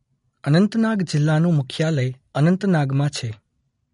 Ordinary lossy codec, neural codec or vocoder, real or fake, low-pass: MP3, 48 kbps; none; real; 10.8 kHz